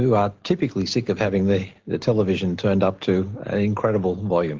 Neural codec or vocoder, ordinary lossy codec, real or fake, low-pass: none; Opus, 16 kbps; real; 7.2 kHz